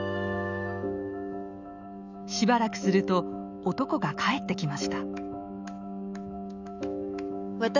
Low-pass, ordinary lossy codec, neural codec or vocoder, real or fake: 7.2 kHz; none; autoencoder, 48 kHz, 128 numbers a frame, DAC-VAE, trained on Japanese speech; fake